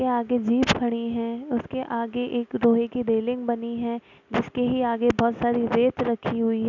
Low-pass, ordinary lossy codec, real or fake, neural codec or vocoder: 7.2 kHz; none; real; none